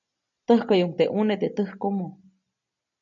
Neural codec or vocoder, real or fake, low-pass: none; real; 7.2 kHz